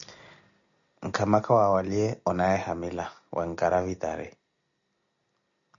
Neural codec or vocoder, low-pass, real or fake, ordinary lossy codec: none; 7.2 kHz; real; MP3, 96 kbps